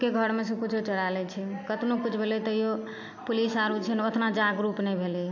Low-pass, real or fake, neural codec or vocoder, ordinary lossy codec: 7.2 kHz; real; none; AAC, 48 kbps